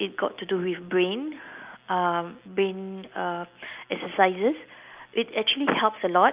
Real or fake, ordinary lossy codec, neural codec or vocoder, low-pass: real; Opus, 24 kbps; none; 3.6 kHz